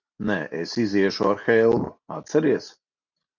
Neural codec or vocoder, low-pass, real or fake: none; 7.2 kHz; real